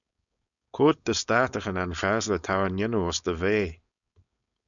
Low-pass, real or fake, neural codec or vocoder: 7.2 kHz; fake; codec, 16 kHz, 4.8 kbps, FACodec